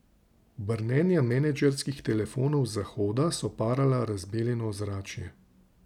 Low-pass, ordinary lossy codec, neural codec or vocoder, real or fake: 19.8 kHz; none; none; real